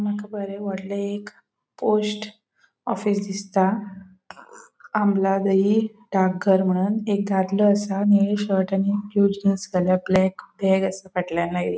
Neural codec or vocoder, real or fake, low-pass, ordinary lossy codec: none; real; none; none